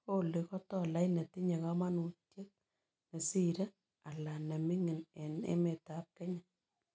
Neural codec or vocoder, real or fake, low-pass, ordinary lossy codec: none; real; none; none